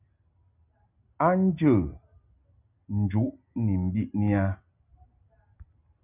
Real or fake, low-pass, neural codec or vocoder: real; 3.6 kHz; none